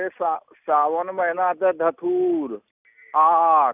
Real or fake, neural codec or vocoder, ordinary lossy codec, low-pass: real; none; none; 3.6 kHz